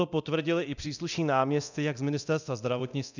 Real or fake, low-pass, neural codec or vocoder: fake; 7.2 kHz; codec, 24 kHz, 0.9 kbps, DualCodec